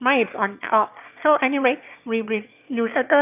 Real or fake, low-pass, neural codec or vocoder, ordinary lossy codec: fake; 3.6 kHz; autoencoder, 22.05 kHz, a latent of 192 numbers a frame, VITS, trained on one speaker; none